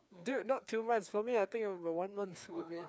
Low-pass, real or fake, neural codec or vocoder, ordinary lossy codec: none; fake; codec, 16 kHz, 2 kbps, FreqCodec, larger model; none